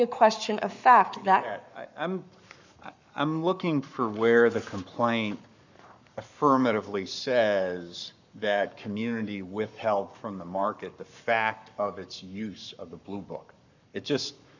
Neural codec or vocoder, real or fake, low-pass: codec, 44.1 kHz, 7.8 kbps, Pupu-Codec; fake; 7.2 kHz